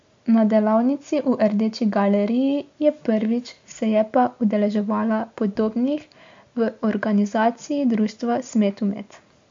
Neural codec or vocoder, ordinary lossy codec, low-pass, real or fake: none; MP3, 48 kbps; 7.2 kHz; real